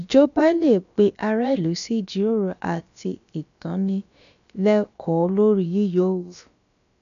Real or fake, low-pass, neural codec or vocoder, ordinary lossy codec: fake; 7.2 kHz; codec, 16 kHz, about 1 kbps, DyCAST, with the encoder's durations; none